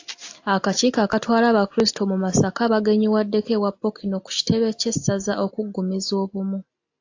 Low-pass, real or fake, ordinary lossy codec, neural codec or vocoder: 7.2 kHz; real; AAC, 48 kbps; none